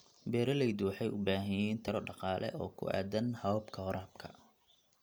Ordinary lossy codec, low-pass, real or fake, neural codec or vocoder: none; none; real; none